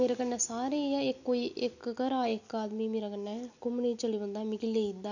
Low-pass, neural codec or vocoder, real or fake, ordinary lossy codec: 7.2 kHz; none; real; none